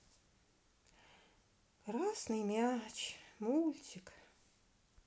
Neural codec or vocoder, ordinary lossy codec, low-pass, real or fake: none; none; none; real